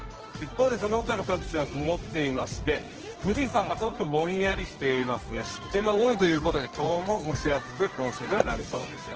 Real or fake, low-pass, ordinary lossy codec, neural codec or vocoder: fake; 7.2 kHz; Opus, 16 kbps; codec, 24 kHz, 0.9 kbps, WavTokenizer, medium music audio release